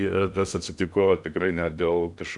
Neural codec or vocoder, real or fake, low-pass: codec, 16 kHz in and 24 kHz out, 0.8 kbps, FocalCodec, streaming, 65536 codes; fake; 10.8 kHz